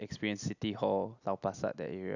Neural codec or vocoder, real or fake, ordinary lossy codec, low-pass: none; real; none; 7.2 kHz